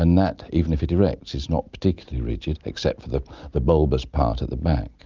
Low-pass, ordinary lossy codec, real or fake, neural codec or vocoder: 7.2 kHz; Opus, 24 kbps; real; none